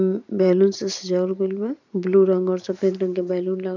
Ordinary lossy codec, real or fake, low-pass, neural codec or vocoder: none; fake; 7.2 kHz; vocoder, 44.1 kHz, 128 mel bands every 512 samples, BigVGAN v2